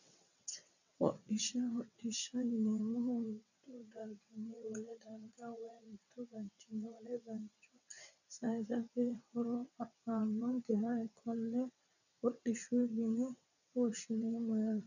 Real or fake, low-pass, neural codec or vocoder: fake; 7.2 kHz; vocoder, 22.05 kHz, 80 mel bands, WaveNeXt